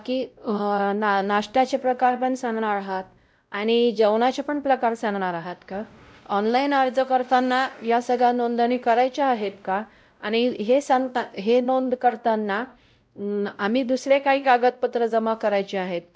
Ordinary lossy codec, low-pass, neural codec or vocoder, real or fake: none; none; codec, 16 kHz, 0.5 kbps, X-Codec, WavLM features, trained on Multilingual LibriSpeech; fake